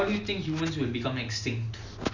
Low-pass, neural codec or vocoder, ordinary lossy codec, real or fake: 7.2 kHz; none; none; real